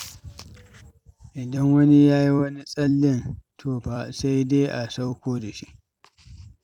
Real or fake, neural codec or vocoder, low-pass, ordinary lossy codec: fake; vocoder, 44.1 kHz, 128 mel bands every 256 samples, BigVGAN v2; 19.8 kHz; none